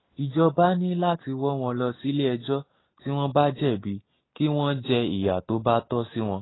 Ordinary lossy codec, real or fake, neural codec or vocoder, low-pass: AAC, 16 kbps; real; none; 7.2 kHz